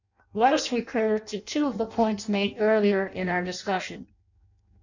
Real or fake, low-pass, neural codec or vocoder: fake; 7.2 kHz; codec, 16 kHz in and 24 kHz out, 0.6 kbps, FireRedTTS-2 codec